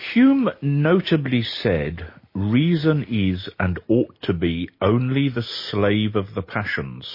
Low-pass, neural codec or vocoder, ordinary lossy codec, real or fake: 5.4 kHz; none; MP3, 24 kbps; real